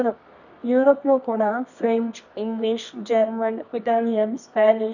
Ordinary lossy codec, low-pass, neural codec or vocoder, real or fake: none; 7.2 kHz; codec, 24 kHz, 0.9 kbps, WavTokenizer, medium music audio release; fake